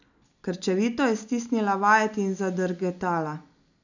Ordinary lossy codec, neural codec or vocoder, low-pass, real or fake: none; none; 7.2 kHz; real